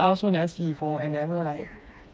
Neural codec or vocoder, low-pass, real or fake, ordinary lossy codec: codec, 16 kHz, 2 kbps, FreqCodec, smaller model; none; fake; none